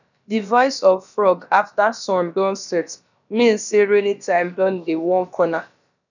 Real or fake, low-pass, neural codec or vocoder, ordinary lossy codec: fake; 7.2 kHz; codec, 16 kHz, about 1 kbps, DyCAST, with the encoder's durations; none